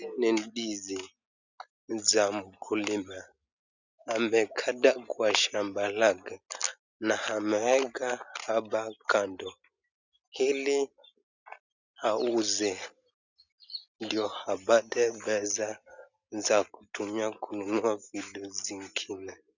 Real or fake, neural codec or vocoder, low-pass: real; none; 7.2 kHz